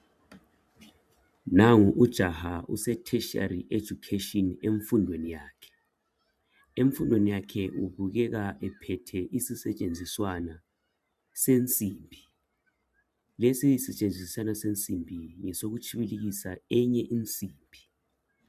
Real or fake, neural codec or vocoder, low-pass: fake; vocoder, 44.1 kHz, 128 mel bands every 512 samples, BigVGAN v2; 14.4 kHz